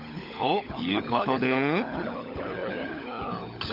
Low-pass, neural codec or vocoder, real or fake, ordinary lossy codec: 5.4 kHz; codec, 16 kHz, 16 kbps, FunCodec, trained on LibriTTS, 50 frames a second; fake; AAC, 48 kbps